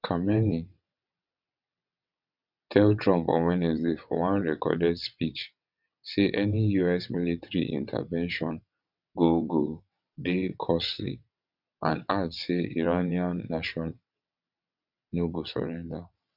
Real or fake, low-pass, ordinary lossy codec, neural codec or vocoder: fake; 5.4 kHz; none; vocoder, 22.05 kHz, 80 mel bands, WaveNeXt